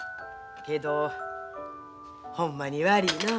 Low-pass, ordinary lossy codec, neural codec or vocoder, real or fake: none; none; none; real